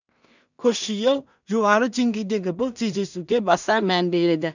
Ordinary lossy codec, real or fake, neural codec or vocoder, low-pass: none; fake; codec, 16 kHz in and 24 kHz out, 0.4 kbps, LongCat-Audio-Codec, two codebook decoder; 7.2 kHz